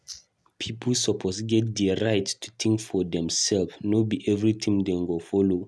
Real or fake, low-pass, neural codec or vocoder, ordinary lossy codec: real; none; none; none